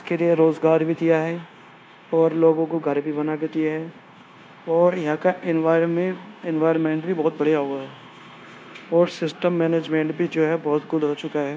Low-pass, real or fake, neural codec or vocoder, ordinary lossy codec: none; fake; codec, 16 kHz, 0.9 kbps, LongCat-Audio-Codec; none